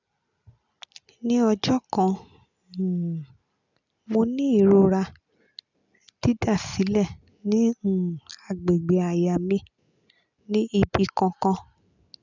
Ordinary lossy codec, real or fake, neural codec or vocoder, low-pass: none; real; none; 7.2 kHz